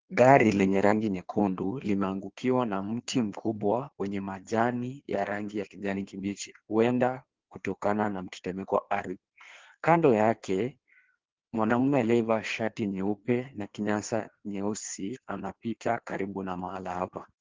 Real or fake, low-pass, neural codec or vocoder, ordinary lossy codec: fake; 7.2 kHz; codec, 16 kHz in and 24 kHz out, 1.1 kbps, FireRedTTS-2 codec; Opus, 16 kbps